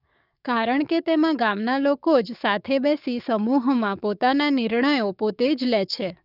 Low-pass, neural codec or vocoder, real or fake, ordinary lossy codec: 5.4 kHz; codec, 44.1 kHz, 7.8 kbps, DAC; fake; none